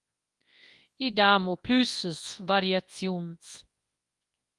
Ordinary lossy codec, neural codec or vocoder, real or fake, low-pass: Opus, 32 kbps; codec, 24 kHz, 0.9 kbps, WavTokenizer, large speech release; fake; 10.8 kHz